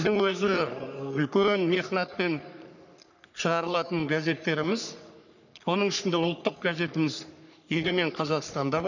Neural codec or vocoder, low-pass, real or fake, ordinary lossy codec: codec, 44.1 kHz, 3.4 kbps, Pupu-Codec; 7.2 kHz; fake; none